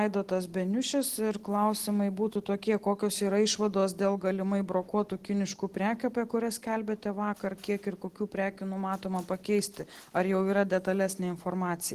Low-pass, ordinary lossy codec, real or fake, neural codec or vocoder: 14.4 kHz; Opus, 16 kbps; real; none